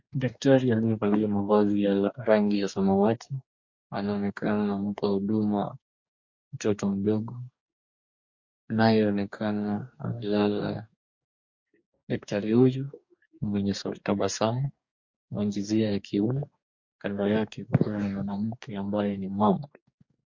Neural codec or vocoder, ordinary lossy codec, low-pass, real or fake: codec, 44.1 kHz, 2.6 kbps, DAC; MP3, 48 kbps; 7.2 kHz; fake